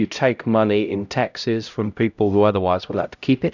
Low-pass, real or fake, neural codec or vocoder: 7.2 kHz; fake; codec, 16 kHz, 0.5 kbps, X-Codec, HuBERT features, trained on LibriSpeech